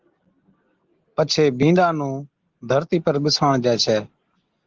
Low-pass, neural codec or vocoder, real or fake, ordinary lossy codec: 7.2 kHz; none; real; Opus, 16 kbps